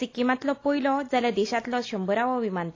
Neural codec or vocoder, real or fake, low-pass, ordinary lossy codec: none; real; 7.2 kHz; AAC, 32 kbps